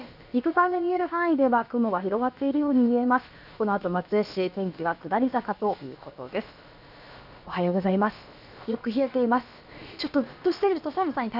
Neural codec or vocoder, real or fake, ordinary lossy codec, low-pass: codec, 16 kHz, about 1 kbps, DyCAST, with the encoder's durations; fake; none; 5.4 kHz